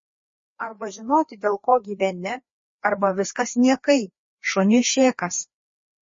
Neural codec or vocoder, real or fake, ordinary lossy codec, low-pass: codec, 16 kHz, 2 kbps, FreqCodec, larger model; fake; MP3, 32 kbps; 7.2 kHz